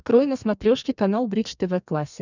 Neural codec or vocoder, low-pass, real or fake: codec, 32 kHz, 1.9 kbps, SNAC; 7.2 kHz; fake